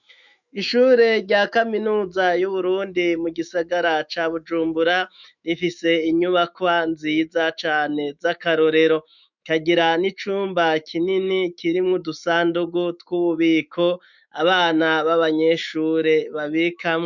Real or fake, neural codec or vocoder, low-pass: fake; autoencoder, 48 kHz, 128 numbers a frame, DAC-VAE, trained on Japanese speech; 7.2 kHz